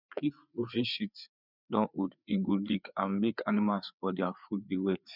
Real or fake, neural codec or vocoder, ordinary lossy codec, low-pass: fake; codec, 16 kHz, 4 kbps, FreqCodec, larger model; none; 5.4 kHz